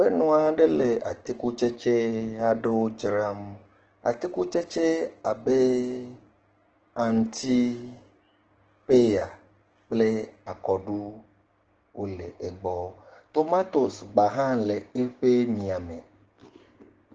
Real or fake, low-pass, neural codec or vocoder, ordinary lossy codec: fake; 7.2 kHz; codec, 16 kHz, 6 kbps, DAC; Opus, 16 kbps